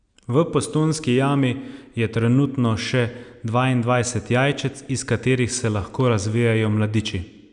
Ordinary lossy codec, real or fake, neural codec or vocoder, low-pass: none; real; none; 9.9 kHz